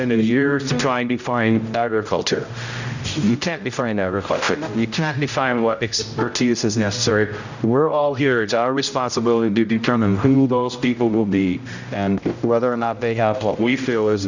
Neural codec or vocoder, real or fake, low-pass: codec, 16 kHz, 0.5 kbps, X-Codec, HuBERT features, trained on general audio; fake; 7.2 kHz